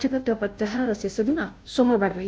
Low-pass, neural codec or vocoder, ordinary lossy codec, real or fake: none; codec, 16 kHz, 0.5 kbps, FunCodec, trained on Chinese and English, 25 frames a second; none; fake